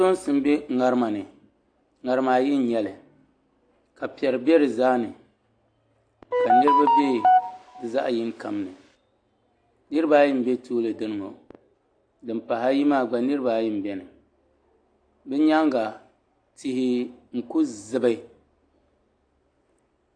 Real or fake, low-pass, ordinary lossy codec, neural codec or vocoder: real; 9.9 kHz; AAC, 48 kbps; none